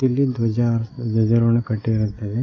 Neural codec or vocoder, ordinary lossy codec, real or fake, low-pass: none; AAC, 32 kbps; real; 7.2 kHz